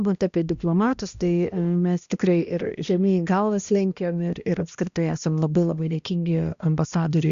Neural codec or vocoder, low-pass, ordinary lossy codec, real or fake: codec, 16 kHz, 1 kbps, X-Codec, HuBERT features, trained on balanced general audio; 7.2 kHz; Opus, 64 kbps; fake